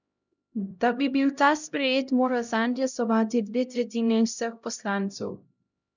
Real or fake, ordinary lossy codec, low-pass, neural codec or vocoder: fake; none; 7.2 kHz; codec, 16 kHz, 0.5 kbps, X-Codec, HuBERT features, trained on LibriSpeech